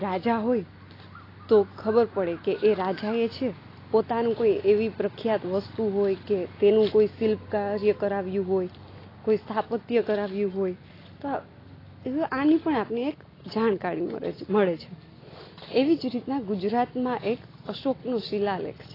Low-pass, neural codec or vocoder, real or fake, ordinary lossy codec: 5.4 kHz; none; real; AAC, 24 kbps